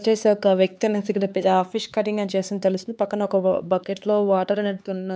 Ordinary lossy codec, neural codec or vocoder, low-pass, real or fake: none; codec, 16 kHz, 4 kbps, X-Codec, HuBERT features, trained on LibriSpeech; none; fake